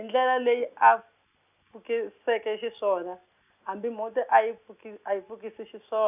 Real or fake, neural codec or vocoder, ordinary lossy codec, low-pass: real; none; none; 3.6 kHz